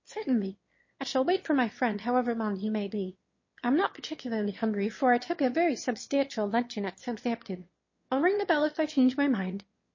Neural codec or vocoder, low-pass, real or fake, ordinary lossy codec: autoencoder, 22.05 kHz, a latent of 192 numbers a frame, VITS, trained on one speaker; 7.2 kHz; fake; MP3, 32 kbps